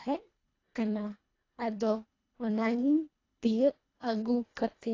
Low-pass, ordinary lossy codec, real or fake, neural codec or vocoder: 7.2 kHz; AAC, 32 kbps; fake; codec, 24 kHz, 1.5 kbps, HILCodec